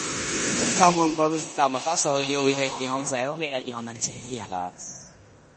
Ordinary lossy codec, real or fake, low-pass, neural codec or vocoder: MP3, 32 kbps; fake; 10.8 kHz; codec, 16 kHz in and 24 kHz out, 0.9 kbps, LongCat-Audio-Codec, four codebook decoder